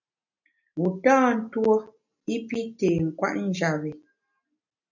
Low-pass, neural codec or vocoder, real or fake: 7.2 kHz; none; real